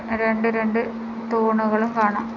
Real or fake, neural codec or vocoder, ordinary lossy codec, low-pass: real; none; none; 7.2 kHz